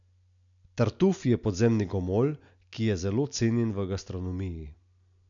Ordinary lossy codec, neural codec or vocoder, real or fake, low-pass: none; none; real; 7.2 kHz